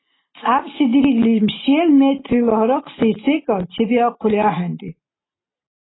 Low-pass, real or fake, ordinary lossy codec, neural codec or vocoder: 7.2 kHz; real; AAC, 16 kbps; none